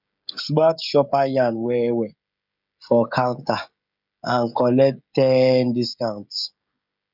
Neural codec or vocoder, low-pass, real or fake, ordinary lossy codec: codec, 16 kHz, 16 kbps, FreqCodec, smaller model; 5.4 kHz; fake; none